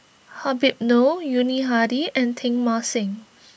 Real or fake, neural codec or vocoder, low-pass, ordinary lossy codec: real; none; none; none